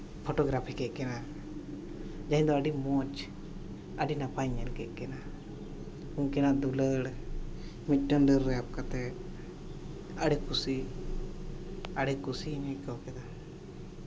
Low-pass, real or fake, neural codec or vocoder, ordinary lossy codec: none; real; none; none